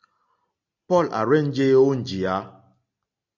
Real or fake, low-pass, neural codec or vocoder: real; 7.2 kHz; none